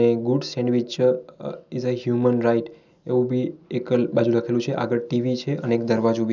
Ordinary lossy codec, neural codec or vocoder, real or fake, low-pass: none; none; real; 7.2 kHz